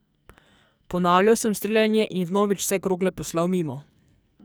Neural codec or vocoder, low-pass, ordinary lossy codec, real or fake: codec, 44.1 kHz, 2.6 kbps, SNAC; none; none; fake